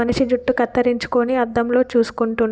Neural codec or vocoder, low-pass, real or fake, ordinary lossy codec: none; none; real; none